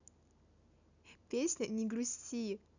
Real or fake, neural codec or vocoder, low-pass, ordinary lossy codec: real; none; 7.2 kHz; none